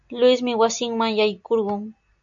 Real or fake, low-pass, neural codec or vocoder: real; 7.2 kHz; none